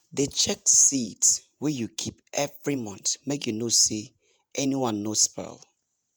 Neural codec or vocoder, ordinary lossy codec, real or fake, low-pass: vocoder, 48 kHz, 128 mel bands, Vocos; none; fake; none